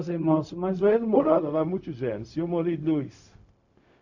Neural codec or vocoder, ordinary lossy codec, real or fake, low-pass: codec, 16 kHz, 0.4 kbps, LongCat-Audio-Codec; none; fake; 7.2 kHz